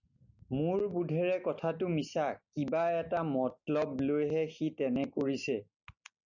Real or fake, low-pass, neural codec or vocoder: real; 7.2 kHz; none